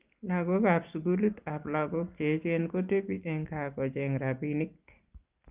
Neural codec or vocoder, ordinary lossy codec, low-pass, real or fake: none; Opus, 24 kbps; 3.6 kHz; real